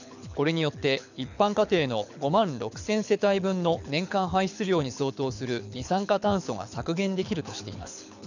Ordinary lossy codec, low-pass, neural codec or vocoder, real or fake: none; 7.2 kHz; codec, 24 kHz, 6 kbps, HILCodec; fake